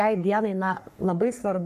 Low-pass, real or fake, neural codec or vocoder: 14.4 kHz; fake; codec, 44.1 kHz, 3.4 kbps, Pupu-Codec